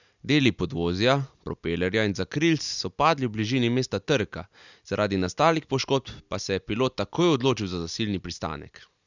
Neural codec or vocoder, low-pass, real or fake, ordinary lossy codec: none; 7.2 kHz; real; none